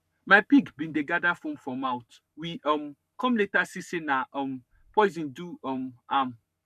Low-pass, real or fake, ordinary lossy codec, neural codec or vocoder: 14.4 kHz; fake; none; codec, 44.1 kHz, 7.8 kbps, Pupu-Codec